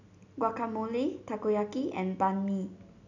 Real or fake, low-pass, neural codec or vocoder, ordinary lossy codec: real; 7.2 kHz; none; none